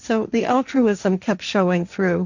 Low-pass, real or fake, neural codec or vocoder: 7.2 kHz; fake; codec, 16 kHz, 1.1 kbps, Voila-Tokenizer